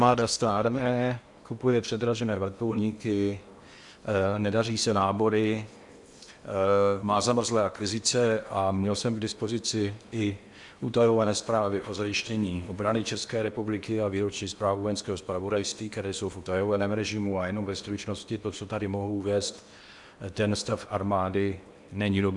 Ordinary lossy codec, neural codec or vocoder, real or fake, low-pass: Opus, 64 kbps; codec, 16 kHz in and 24 kHz out, 0.8 kbps, FocalCodec, streaming, 65536 codes; fake; 10.8 kHz